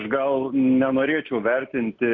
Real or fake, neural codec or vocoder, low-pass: real; none; 7.2 kHz